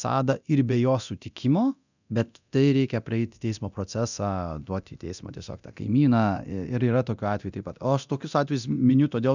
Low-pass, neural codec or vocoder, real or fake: 7.2 kHz; codec, 24 kHz, 0.9 kbps, DualCodec; fake